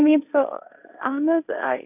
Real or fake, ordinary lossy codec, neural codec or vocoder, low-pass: fake; none; codec, 16 kHz, 1.1 kbps, Voila-Tokenizer; 3.6 kHz